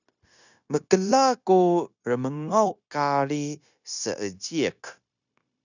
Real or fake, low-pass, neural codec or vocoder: fake; 7.2 kHz; codec, 16 kHz, 0.9 kbps, LongCat-Audio-Codec